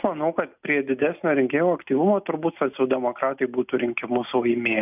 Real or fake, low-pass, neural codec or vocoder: real; 3.6 kHz; none